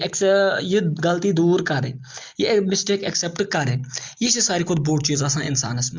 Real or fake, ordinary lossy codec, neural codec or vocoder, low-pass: real; Opus, 32 kbps; none; 7.2 kHz